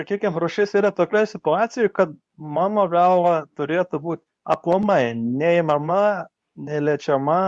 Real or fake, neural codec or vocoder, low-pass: fake; codec, 24 kHz, 0.9 kbps, WavTokenizer, medium speech release version 2; 10.8 kHz